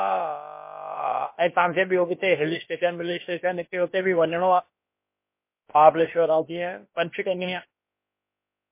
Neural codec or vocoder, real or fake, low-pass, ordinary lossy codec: codec, 16 kHz, about 1 kbps, DyCAST, with the encoder's durations; fake; 3.6 kHz; MP3, 24 kbps